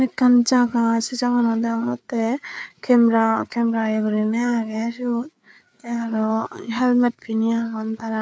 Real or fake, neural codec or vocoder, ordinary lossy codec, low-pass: fake; codec, 16 kHz, 4 kbps, FreqCodec, larger model; none; none